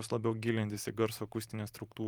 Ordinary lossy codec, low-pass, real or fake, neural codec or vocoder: Opus, 32 kbps; 19.8 kHz; fake; vocoder, 44.1 kHz, 128 mel bands every 512 samples, BigVGAN v2